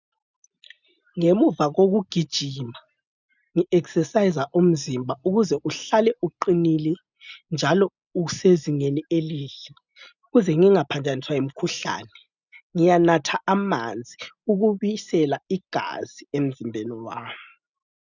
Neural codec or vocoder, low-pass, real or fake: none; 7.2 kHz; real